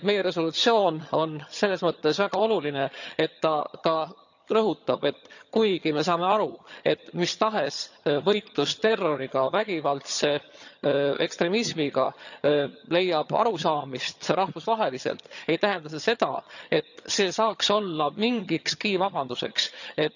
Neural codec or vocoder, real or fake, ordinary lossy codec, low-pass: vocoder, 22.05 kHz, 80 mel bands, HiFi-GAN; fake; none; 7.2 kHz